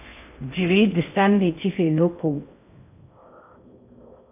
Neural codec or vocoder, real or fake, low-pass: codec, 16 kHz in and 24 kHz out, 0.6 kbps, FocalCodec, streaming, 2048 codes; fake; 3.6 kHz